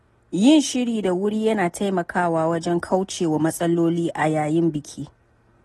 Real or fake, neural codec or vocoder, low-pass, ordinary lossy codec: real; none; 19.8 kHz; AAC, 32 kbps